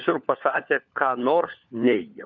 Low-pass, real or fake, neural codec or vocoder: 7.2 kHz; fake; codec, 16 kHz, 4 kbps, FunCodec, trained on LibriTTS, 50 frames a second